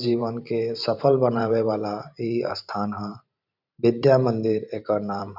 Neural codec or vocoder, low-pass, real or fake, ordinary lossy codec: vocoder, 44.1 kHz, 128 mel bands every 512 samples, BigVGAN v2; 5.4 kHz; fake; none